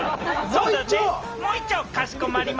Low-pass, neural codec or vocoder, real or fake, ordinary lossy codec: 7.2 kHz; none; real; Opus, 24 kbps